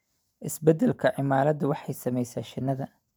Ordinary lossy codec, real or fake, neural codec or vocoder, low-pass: none; fake; vocoder, 44.1 kHz, 128 mel bands every 512 samples, BigVGAN v2; none